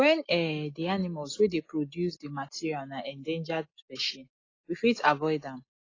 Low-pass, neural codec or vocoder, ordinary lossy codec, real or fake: 7.2 kHz; none; AAC, 32 kbps; real